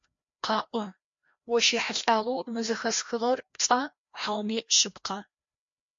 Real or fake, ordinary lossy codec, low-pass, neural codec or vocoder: fake; MP3, 48 kbps; 7.2 kHz; codec, 16 kHz, 1 kbps, FreqCodec, larger model